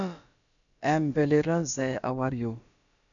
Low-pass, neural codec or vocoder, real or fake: 7.2 kHz; codec, 16 kHz, about 1 kbps, DyCAST, with the encoder's durations; fake